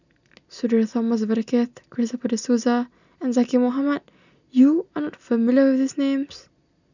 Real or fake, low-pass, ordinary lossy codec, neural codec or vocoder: real; 7.2 kHz; none; none